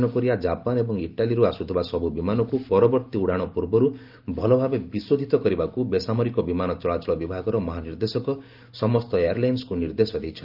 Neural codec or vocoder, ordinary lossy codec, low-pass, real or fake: none; Opus, 32 kbps; 5.4 kHz; real